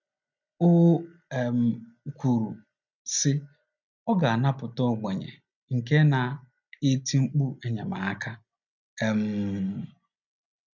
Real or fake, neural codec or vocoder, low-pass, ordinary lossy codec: real; none; 7.2 kHz; none